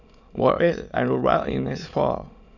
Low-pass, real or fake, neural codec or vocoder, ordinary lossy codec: 7.2 kHz; fake; autoencoder, 22.05 kHz, a latent of 192 numbers a frame, VITS, trained on many speakers; none